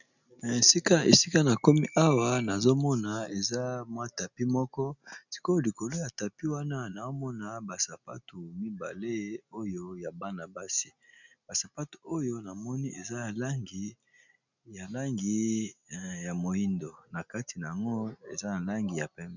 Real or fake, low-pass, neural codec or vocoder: real; 7.2 kHz; none